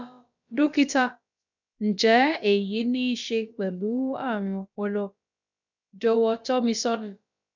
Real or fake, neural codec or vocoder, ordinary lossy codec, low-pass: fake; codec, 16 kHz, about 1 kbps, DyCAST, with the encoder's durations; none; 7.2 kHz